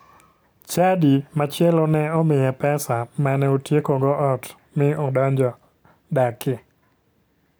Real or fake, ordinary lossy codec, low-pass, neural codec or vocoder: real; none; none; none